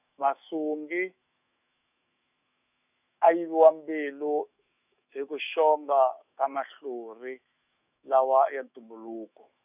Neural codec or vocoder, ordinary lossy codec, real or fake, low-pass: autoencoder, 48 kHz, 128 numbers a frame, DAC-VAE, trained on Japanese speech; none; fake; 3.6 kHz